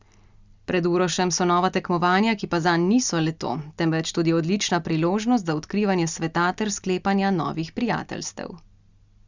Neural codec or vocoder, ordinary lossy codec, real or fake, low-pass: none; Opus, 64 kbps; real; 7.2 kHz